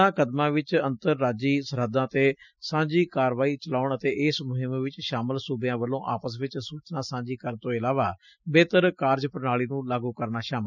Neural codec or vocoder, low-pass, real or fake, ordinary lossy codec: none; 7.2 kHz; real; none